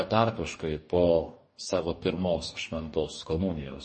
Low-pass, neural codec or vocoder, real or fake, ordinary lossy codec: 10.8 kHz; codec, 44.1 kHz, 2.6 kbps, DAC; fake; MP3, 32 kbps